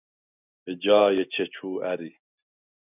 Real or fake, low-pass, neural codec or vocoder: real; 3.6 kHz; none